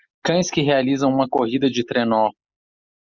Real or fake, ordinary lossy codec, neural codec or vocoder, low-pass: real; Opus, 64 kbps; none; 7.2 kHz